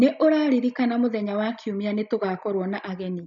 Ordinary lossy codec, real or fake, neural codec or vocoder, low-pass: MP3, 64 kbps; real; none; 7.2 kHz